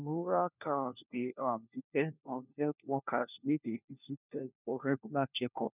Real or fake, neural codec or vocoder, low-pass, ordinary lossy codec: fake; codec, 16 kHz, 1 kbps, FunCodec, trained on LibriTTS, 50 frames a second; 3.6 kHz; none